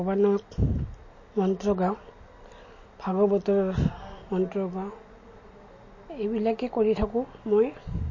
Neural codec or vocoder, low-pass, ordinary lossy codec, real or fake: none; 7.2 kHz; MP3, 32 kbps; real